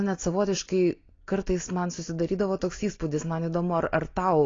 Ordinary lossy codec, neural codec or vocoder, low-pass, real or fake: AAC, 32 kbps; none; 7.2 kHz; real